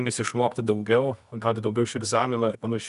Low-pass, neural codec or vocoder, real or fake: 10.8 kHz; codec, 24 kHz, 0.9 kbps, WavTokenizer, medium music audio release; fake